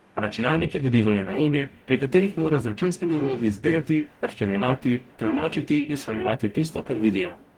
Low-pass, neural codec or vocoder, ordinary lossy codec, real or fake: 19.8 kHz; codec, 44.1 kHz, 0.9 kbps, DAC; Opus, 24 kbps; fake